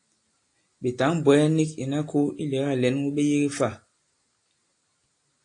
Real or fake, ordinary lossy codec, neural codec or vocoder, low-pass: real; AAC, 48 kbps; none; 9.9 kHz